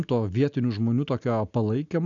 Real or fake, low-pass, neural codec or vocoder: real; 7.2 kHz; none